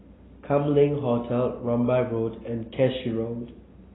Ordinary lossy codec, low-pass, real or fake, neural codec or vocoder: AAC, 16 kbps; 7.2 kHz; real; none